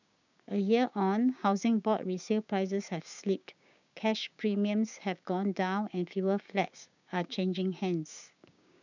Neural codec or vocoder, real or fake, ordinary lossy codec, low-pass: codec, 16 kHz, 6 kbps, DAC; fake; none; 7.2 kHz